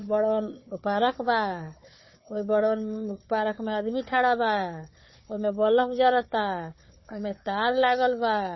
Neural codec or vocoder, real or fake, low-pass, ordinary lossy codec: codec, 16 kHz, 4 kbps, FunCodec, trained on Chinese and English, 50 frames a second; fake; 7.2 kHz; MP3, 24 kbps